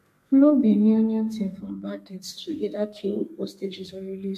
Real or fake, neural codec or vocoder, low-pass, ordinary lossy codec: fake; codec, 32 kHz, 1.9 kbps, SNAC; 14.4 kHz; MP3, 64 kbps